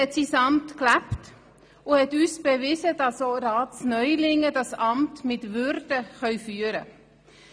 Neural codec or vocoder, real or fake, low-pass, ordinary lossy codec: none; real; none; none